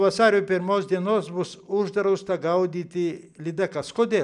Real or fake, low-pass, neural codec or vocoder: real; 10.8 kHz; none